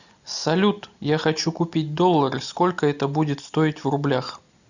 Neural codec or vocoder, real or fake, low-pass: none; real; 7.2 kHz